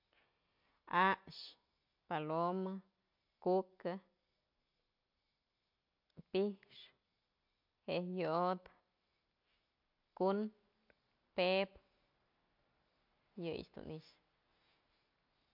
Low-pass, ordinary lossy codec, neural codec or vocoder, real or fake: 5.4 kHz; MP3, 48 kbps; none; real